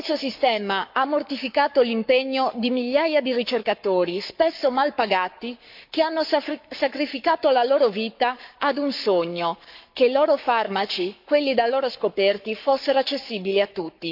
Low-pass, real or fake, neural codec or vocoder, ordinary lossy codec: 5.4 kHz; fake; codec, 44.1 kHz, 7.8 kbps, Pupu-Codec; MP3, 48 kbps